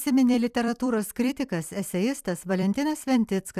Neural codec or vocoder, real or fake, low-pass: vocoder, 44.1 kHz, 128 mel bands every 256 samples, BigVGAN v2; fake; 14.4 kHz